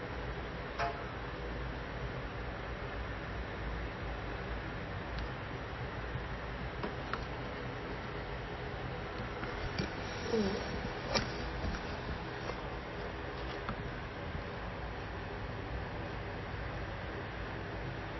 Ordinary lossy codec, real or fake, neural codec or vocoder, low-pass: MP3, 24 kbps; real; none; 7.2 kHz